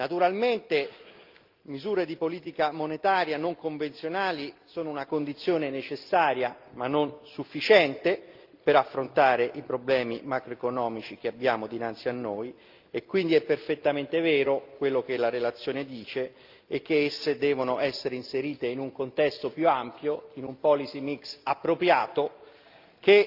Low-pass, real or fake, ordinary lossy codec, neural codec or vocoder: 5.4 kHz; real; Opus, 24 kbps; none